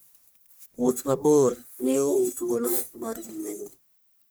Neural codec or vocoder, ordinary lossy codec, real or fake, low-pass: codec, 44.1 kHz, 1.7 kbps, Pupu-Codec; none; fake; none